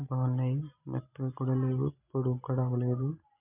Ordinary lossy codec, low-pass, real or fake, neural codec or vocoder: none; 3.6 kHz; real; none